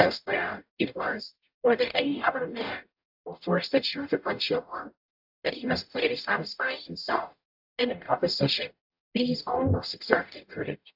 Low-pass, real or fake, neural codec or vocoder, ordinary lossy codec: 5.4 kHz; fake; codec, 44.1 kHz, 0.9 kbps, DAC; AAC, 48 kbps